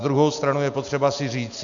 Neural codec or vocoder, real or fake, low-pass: none; real; 7.2 kHz